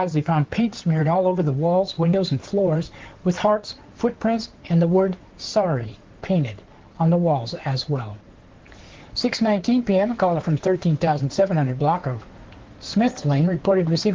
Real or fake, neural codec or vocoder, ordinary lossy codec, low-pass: fake; codec, 16 kHz in and 24 kHz out, 2.2 kbps, FireRedTTS-2 codec; Opus, 16 kbps; 7.2 kHz